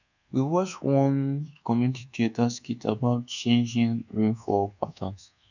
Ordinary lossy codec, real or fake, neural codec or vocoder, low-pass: none; fake; codec, 24 kHz, 1.2 kbps, DualCodec; 7.2 kHz